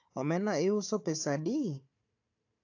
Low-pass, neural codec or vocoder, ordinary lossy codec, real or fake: 7.2 kHz; codec, 16 kHz, 4 kbps, FunCodec, trained on Chinese and English, 50 frames a second; AAC, 48 kbps; fake